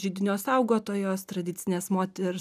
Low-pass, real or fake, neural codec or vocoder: 14.4 kHz; real; none